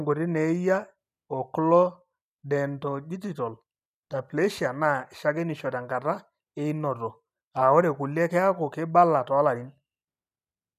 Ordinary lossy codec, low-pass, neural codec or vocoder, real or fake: none; 14.4 kHz; none; real